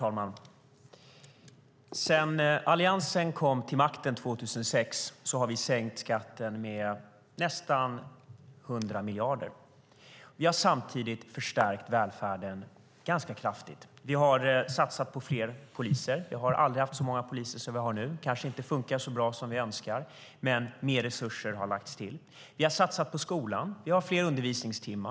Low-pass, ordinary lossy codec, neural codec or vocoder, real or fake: none; none; none; real